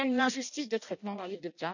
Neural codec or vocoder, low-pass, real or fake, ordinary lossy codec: codec, 16 kHz in and 24 kHz out, 0.6 kbps, FireRedTTS-2 codec; 7.2 kHz; fake; none